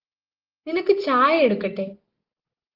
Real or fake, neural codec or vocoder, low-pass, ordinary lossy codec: real; none; 5.4 kHz; Opus, 16 kbps